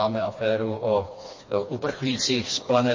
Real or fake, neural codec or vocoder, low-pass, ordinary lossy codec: fake; codec, 16 kHz, 2 kbps, FreqCodec, smaller model; 7.2 kHz; MP3, 32 kbps